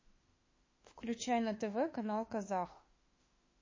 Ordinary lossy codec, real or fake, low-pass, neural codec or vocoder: MP3, 32 kbps; fake; 7.2 kHz; autoencoder, 48 kHz, 32 numbers a frame, DAC-VAE, trained on Japanese speech